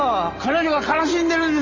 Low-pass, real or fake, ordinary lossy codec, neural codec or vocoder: 7.2 kHz; real; Opus, 32 kbps; none